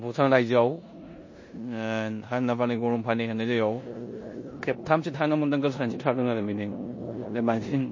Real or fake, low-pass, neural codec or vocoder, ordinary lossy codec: fake; 7.2 kHz; codec, 16 kHz in and 24 kHz out, 0.9 kbps, LongCat-Audio-Codec, four codebook decoder; MP3, 32 kbps